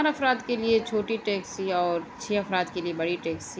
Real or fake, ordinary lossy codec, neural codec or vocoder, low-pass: real; none; none; none